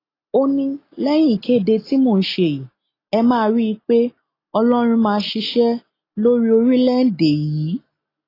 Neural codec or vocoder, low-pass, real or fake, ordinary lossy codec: none; 5.4 kHz; real; AAC, 24 kbps